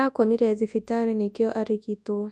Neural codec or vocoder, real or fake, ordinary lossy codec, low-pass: codec, 24 kHz, 0.9 kbps, WavTokenizer, large speech release; fake; none; none